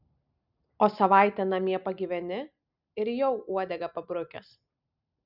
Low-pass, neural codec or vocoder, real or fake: 5.4 kHz; none; real